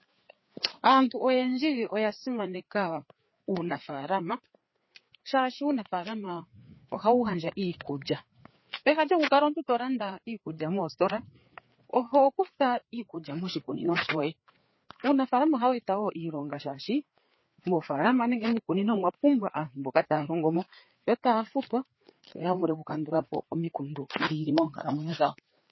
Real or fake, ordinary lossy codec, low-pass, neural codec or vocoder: fake; MP3, 24 kbps; 7.2 kHz; codec, 16 kHz, 4 kbps, FreqCodec, larger model